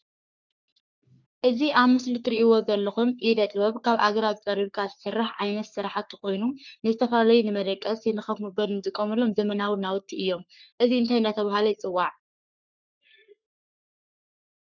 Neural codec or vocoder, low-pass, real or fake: codec, 44.1 kHz, 3.4 kbps, Pupu-Codec; 7.2 kHz; fake